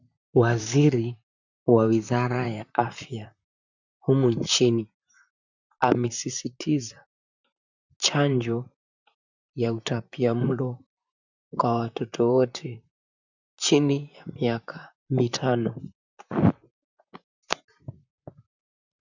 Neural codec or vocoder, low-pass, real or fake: vocoder, 44.1 kHz, 128 mel bands, Pupu-Vocoder; 7.2 kHz; fake